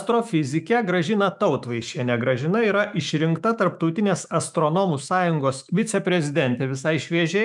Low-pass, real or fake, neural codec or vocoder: 10.8 kHz; fake; autoencoder, 48 kHz, 128 numbers a frame, DAC-VAE, trained on Japanese speech